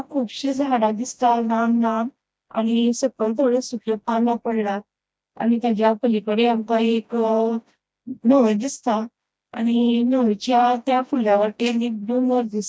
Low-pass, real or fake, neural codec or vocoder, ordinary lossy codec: none; fake; codec, 16 kHz, 1 kbps, FreqCodec, smaller model; none